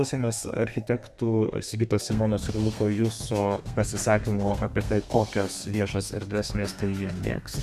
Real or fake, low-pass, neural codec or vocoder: fake; 14.4 kHz; codec, 32 kHz, 1.9 kbps, SNAC